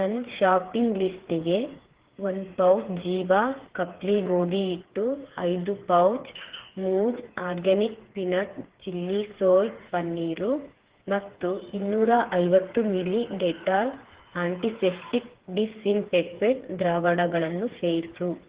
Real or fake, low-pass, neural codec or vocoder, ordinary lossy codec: fake; 3.6 kHz; codec, 16 kHz, 4 kbps, FreqCodec, smaller model; Opus, 16 kbps